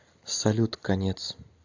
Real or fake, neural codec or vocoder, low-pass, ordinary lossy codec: real; none; 7.2 kHz; AAC, 48 kbps